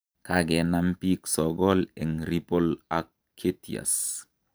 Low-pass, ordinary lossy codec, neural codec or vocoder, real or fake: none; none; vocoder, 44.1 kHz, 128 mel bands every 512 samples, BigVGAN v2; fake